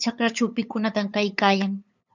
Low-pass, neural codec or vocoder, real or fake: 7.2 kHz; codec, 16 kHz, 8 kbps, FunCodec, trained on LibriTTS, 25 frames a second; fake